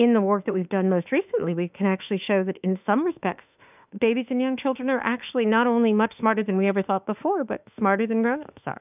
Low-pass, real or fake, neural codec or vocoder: 3.6 kHz; fake; autoencoder, 48 kHz, 32 numbers a frame, DAC-VAE, trained on Japanese speech